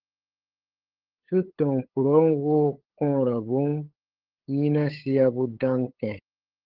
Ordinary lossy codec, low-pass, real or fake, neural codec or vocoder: Opus, 16 kbps; 5.4 kHz; fake; codec, 16 kHz, 8 kbps, FunCodec, trained on LibriTTS, 25 frames a second